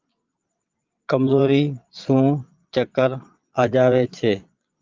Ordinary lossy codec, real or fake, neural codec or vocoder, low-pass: Opus, 24 kbps; fake; vocoder, 22.05 kHz, 80 mel bands, Vocos; 7.2 kHz